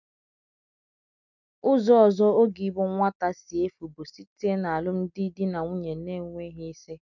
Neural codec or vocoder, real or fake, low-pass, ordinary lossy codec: none; real; 7.2 kHz; none